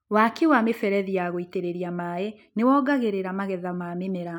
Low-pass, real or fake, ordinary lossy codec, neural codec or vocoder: 19.8 kHz; real; none; none